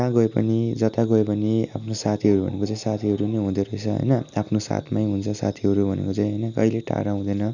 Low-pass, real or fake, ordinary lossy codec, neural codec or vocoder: 7.2 kHz; real; none; none